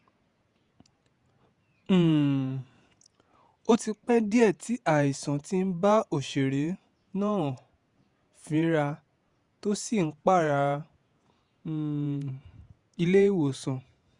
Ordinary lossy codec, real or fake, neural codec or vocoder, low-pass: Opus, 64 kbps; fake; vocoder, 48 kHz, 128 mel bands, Vocos; 10.8 kHz